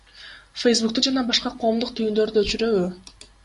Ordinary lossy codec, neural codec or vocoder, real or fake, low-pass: MP3, 48 kbps; none; real; 14.4 kHz